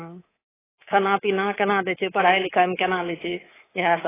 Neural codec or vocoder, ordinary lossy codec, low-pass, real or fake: codec, 24 kHz, 3.1 kbps, DualCodec; AAC, 16 kbps; 3.6 kHz; fake